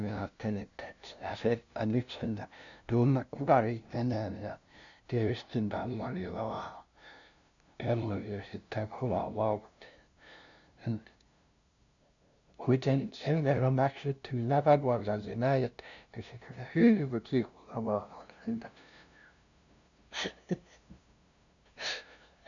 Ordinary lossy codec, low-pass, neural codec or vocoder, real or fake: none; 7.2 kHz; codec, 16 kHz, 0.5 kbps, FunCodec, trained on LibriTTS, 25 frames a second; fake